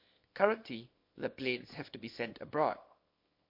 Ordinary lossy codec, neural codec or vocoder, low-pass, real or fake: MP3, 32 kbps; codec, 24 kHz, 0.9 kbps, WavTokenizer, small release; 5.4 kHz; fake